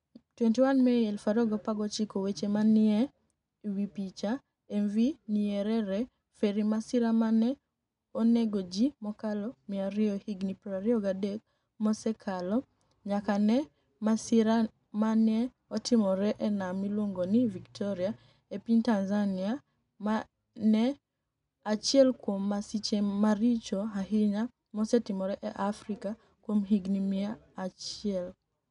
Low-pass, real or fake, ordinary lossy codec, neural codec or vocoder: 10.8 kHz; real; none; none